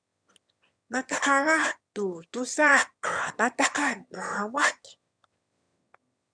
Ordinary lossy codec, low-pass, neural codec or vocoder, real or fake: MP3, 96 kbps; 9.9 kHz; autoencoder, 22.05 kHz, a latent of 192 numbers a frame, VITS, trained on one speaker; fake